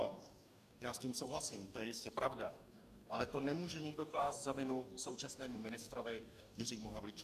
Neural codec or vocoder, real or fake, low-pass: codec, 44.1 kHz, 2.6 kbps, DAC; fake; 14.4 kHz